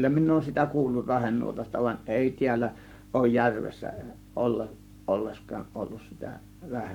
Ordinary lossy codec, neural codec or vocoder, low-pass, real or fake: none; codec, 44.1 kHz, 7.8 kbps, Pupu-Codec; 19.8 kHz; fake